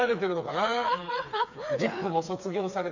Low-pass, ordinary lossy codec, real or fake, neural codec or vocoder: 7.2 kHz; none; fake; codec, 16 kHz, 4 kbps, FreqCodec, smaller model